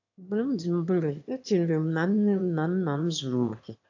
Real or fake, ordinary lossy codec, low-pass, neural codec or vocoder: fake; AAC, 48 kbps; 7.2 kHz; autoencoder, 22.05 kHz, a latent of 192 numbers a frame, VITS, trained on one speaker